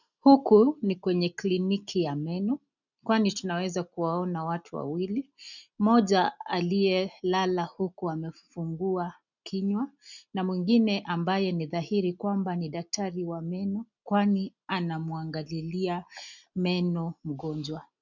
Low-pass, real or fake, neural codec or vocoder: 7.2 kHz; real; none